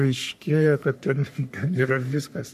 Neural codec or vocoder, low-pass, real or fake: codec, 44.1 kHz, 3.4 kbps, Pupu-Codec; 14.4 kHz; fake